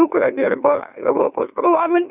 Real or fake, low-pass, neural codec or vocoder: fake; 3.6 kHz; autoencoder, 44.1 kHz, a latent of 192 numbers a frame, MeloTTS